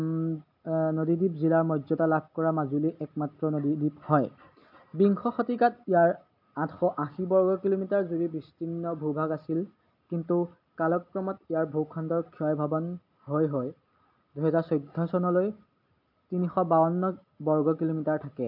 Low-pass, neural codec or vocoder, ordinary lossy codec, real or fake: 5.4 kHz; none; MP3, 48 kbps; real